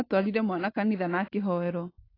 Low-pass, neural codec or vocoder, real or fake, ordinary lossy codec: 5.4 kHz; none; real; AAC, 24 kbps